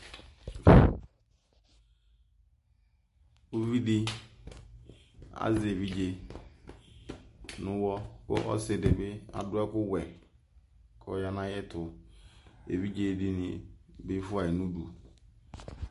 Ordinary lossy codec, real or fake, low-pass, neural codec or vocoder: MP3, 48 kbps; real; 14.4 kHz; none